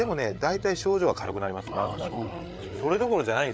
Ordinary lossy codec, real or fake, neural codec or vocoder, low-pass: none; fake; codec, 16 kHz, 8 kbps, FreqCodec, larger model; none